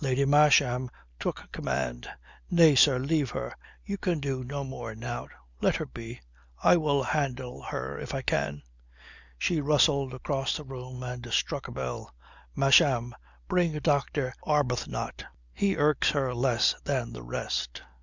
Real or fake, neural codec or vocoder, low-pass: real; none; 7.2 kHz